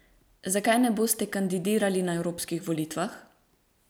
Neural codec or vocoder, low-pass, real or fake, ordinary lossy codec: none; none; real; none